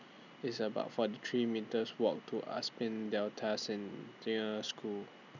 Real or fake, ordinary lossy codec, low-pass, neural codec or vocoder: real; none; 7.2 kHz; none